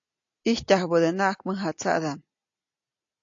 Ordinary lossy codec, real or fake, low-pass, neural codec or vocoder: AAC, 48 kbps; real; 7.2 kHz; none